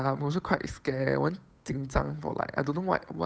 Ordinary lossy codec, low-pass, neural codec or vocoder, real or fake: none; none; codec, 16 kHz, 8 kbps, FunCodec, trained on Chinese and English, 25 frames a second; fake